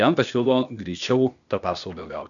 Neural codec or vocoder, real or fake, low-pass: codec, 16 kHz, 0.8 kbps, ZipCodec; fake; 7.2 kHz